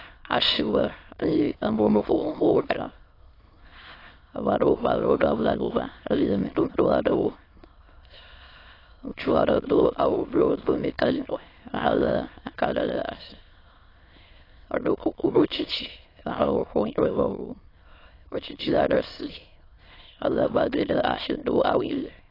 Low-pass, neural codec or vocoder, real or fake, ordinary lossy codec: 5.4 kHz; autoencoder, 22.05 kHz, a latent of 192 numbers a frame, VITS, trained on many speakers; fake; AAC, 24 kbps